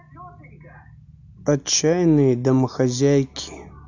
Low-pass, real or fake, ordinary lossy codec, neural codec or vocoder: 7.2 kHz; real; AAC, 48 kbps; none